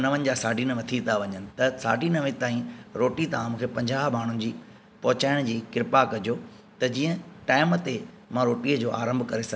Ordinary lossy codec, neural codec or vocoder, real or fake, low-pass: none; none; real; none